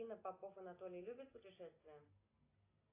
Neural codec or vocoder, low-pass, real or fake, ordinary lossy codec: none; 3.6 kHz; real; AAC, 16 kbps